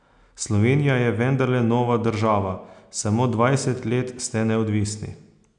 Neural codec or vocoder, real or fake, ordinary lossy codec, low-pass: none; real; none; 9.9 kHz